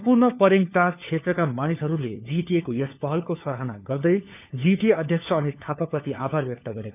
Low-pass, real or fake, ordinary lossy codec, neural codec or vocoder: 3.6 kHz; fake; none; codec, 16 kHz, 4 kbps, FunCodec, trained on LibriTTS, 50 frames a second